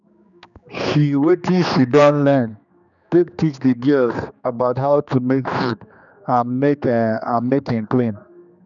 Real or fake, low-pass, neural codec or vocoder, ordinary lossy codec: fake; 7.2 kHz; codec, 16 kHz, 2 kbps, X-Codec, HuBERT features, trained on general audio; none